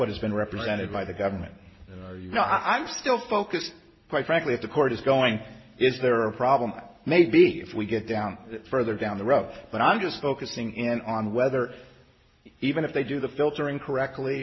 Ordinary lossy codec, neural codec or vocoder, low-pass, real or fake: MP3, 24 kbps; none; 7.2 kHz; real